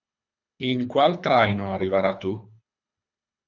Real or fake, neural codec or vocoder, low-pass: fake; codec, 24 kHz, 3 kbps, HILCodec; 7.2 kHz